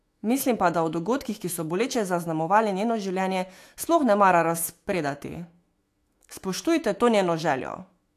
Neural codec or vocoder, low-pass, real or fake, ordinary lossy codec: autoencoder, 48 kHz, 128 numbers a frame, DAC-VAE, trained on Japanese speech; 14.4 kHz; fake; AAC, 64 kbps